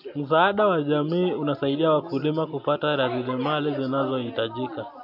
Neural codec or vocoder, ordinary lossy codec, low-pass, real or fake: none; MP3, 48 kbps; 5.4 kHz; real